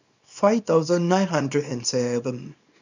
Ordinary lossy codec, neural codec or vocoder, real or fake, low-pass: none; codec, 24 kHz, 0.9 kbps, WavTokenizer, small release; fake; 7.2 kHz